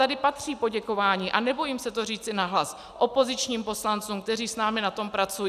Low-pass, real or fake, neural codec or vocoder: 14.4 kHz; real; none